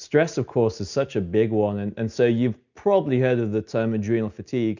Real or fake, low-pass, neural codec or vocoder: real; 7.2 kHz; none